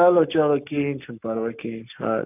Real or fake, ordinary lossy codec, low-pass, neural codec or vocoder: fake; none; 3.6 kHz; codec, 44.1 kHz, 7.8 kbps, Pupu-Codec